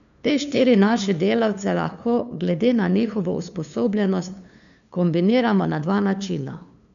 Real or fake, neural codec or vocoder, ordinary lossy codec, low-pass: fake; codec, 16 kHz, 2 kbps, FunCodec, trained on LibriTTS, 25 frames a second; none; 7.2 kHz